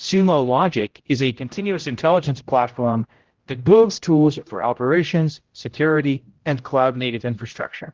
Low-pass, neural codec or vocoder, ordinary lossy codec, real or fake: 7.2 kHz; codec, 16 kHz, 0.5 kbps, X-Codec, HuBERT features, trained on general audio; Opus, 16 kbps; fake